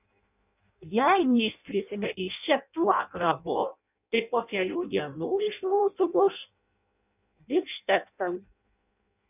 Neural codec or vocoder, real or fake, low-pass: codec, 16 kHz in and 24 kHz out, 0.6 kbps, FireRedTTS-2 codec; fake; 3.6 kHz